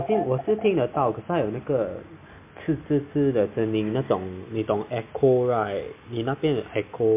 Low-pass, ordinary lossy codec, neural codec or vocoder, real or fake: 3.6 kHz; MP3, 24 kbps; none; real